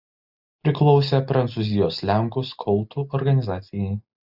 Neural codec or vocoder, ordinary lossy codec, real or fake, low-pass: none; Opus, 64 kbps; real; 5.4 kHz